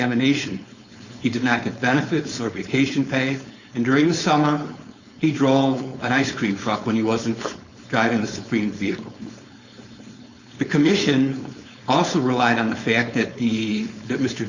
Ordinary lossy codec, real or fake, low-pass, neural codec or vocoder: Opus, 64 kbps; fake; 7.2 kHz; codec, 16 kHz, 4.8 kbps, FACodec